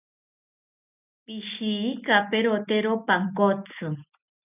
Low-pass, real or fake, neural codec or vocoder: 3.6 kHz; real; none